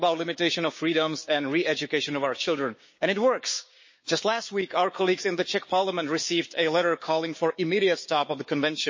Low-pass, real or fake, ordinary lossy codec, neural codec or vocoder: 7.2 kHz; fake; MP3, 32 kbps; codec, 16 kHz, 6 kbps, DAC